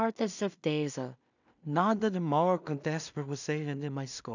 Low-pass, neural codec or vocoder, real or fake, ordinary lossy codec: 7.2 kHz; codec, 16 kHz in and 24 kHz out, 0.4 kbps, LongCat-Audio-Codec, two codebook decoder; fake; none